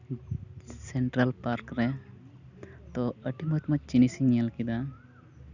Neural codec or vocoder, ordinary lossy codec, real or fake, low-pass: none; none; real; 7.2 kHz